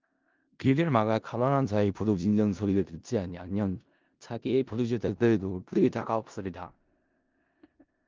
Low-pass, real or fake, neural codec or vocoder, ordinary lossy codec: 7.2 kHz; fake; codec, 16 kHz in and 24 kHz out, 0.4 kbps, LongCat-Audio-Codec, four codebook decoder; Opus, 32 kbps